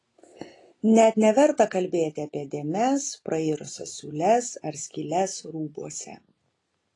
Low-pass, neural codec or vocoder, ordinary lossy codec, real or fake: 10.8 kHz; none; AAC, 32 kbps; real